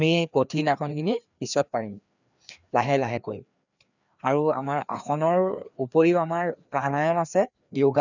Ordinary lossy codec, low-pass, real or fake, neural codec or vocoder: none; 7.2 kHz; fake; codec, 16 kHz, 2 kbps, FreqCodec, larger model